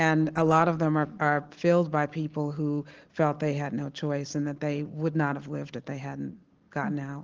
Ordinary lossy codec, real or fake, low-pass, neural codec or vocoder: Opus, 16 kbps; real; 7.2 kHz; none